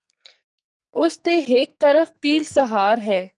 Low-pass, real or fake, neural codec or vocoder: 10.8 kHz; fake; codec, 44.1 kHz, 2.6 kbps, SNAC